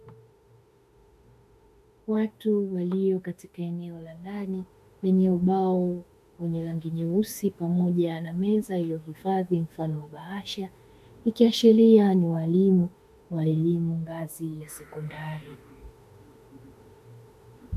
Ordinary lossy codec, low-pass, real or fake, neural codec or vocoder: MP3, 64 kbps; 14.4 kHz; fake; autoencoder, 48 kHz, 32 numbers a frame, DAC-VAE, trained on Japanese speech